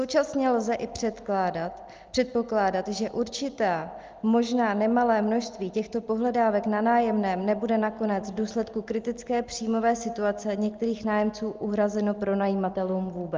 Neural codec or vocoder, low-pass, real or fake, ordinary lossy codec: none; 7.2 kHz; real; Opus, 32 kbps